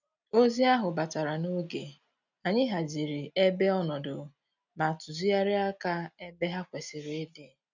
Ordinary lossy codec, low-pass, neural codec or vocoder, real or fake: none; 7.2 kHz; none; real